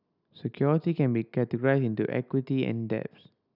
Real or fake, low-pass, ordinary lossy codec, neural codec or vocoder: real; 5.4 kHz; none; none